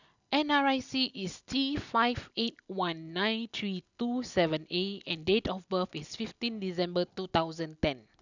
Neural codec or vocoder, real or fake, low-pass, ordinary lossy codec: none; real; 7.2 kHz; none